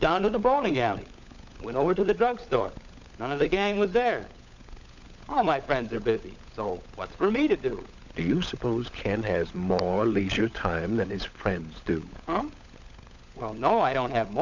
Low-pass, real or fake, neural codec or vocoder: 7.2 kHz; fake; codec, 16 kHz, 16 kbps, FunCodec, trained on LibriTTS, 50 frames a second